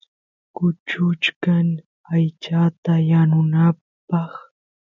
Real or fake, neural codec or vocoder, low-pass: real; none; 7.2 kHz